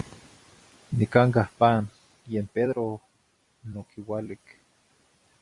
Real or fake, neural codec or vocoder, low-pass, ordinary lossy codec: fake; vocoder, 44.1 kHz, 128 mel bands every 256 samples, BigVGAN v2; 10.8 kHz; Opus, 64 kbps